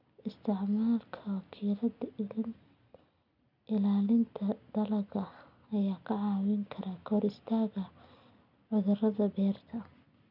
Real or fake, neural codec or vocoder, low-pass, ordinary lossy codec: real; none; 5.4 kHz; none